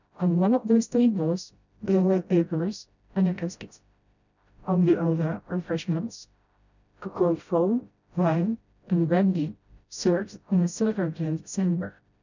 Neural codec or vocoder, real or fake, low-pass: codec, 16 kHz, 0.5 kbps, FreqCodec, smaller model; fake; 7.2 kHz